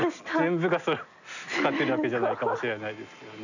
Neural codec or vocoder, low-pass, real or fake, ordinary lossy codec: none; 7.2 kHz; real; none